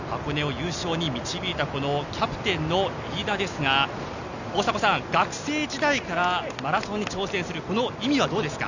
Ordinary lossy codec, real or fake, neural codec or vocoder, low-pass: none; real; none; 7.2 kHz